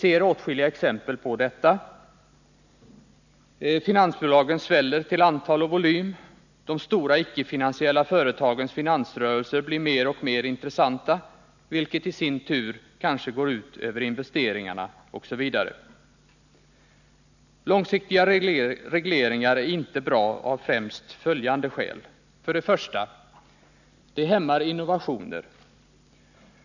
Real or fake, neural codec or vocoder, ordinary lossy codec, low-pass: real; none; none; 7.2 kHz